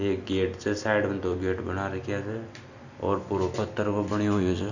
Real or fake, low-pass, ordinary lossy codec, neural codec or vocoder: real; 7.2 kHz; none; none